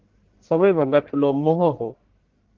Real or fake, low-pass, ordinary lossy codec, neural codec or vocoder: fake; 7.2 kHz; Opus, 16 kbps; codec, 44.1 kHz, 1.7 kbps, Pupu-Codec